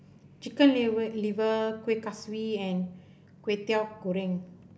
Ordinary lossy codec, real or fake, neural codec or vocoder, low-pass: none; real; none; none